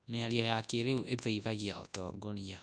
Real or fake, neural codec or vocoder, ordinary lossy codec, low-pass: fake; codec, 24 kHz, 0.9 kbps, WavTokenizer, large speech release; none; 9.9 kHz